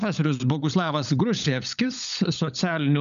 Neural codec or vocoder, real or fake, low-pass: codec, 16 kHz, 16 kbps, FunCodec, trained on LibriTTS, 50 frames a second; fake; 7.2 kHz